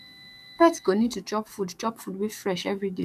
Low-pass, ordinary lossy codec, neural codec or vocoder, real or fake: 14.4 kHz; none; codec, 44.1 kHz, 7.8 kbps, DAC; fake